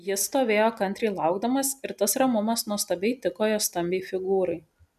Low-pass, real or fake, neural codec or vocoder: 14.4 kHz; real; none